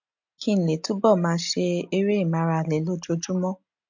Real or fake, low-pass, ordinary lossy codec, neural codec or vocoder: real; 7.2 kHz; MP3, 48 kbps; none